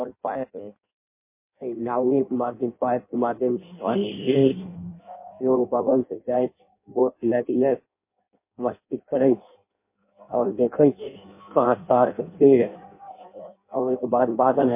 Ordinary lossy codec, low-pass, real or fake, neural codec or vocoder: MP3, 24 kbps; 3.6 kHz; fake; codec, 16 kHz in and 24 kHz out, 0.6 kbps, FireRedTTS-2 codec